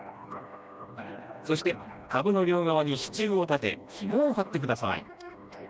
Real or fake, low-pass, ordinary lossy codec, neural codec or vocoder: fake; none; none; codec, 16 kHz, 1 kbps, FreqCodec, smaller model